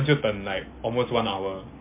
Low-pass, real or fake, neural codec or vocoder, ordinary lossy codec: 3.6 kHz; real; none; MP3, 24 kbps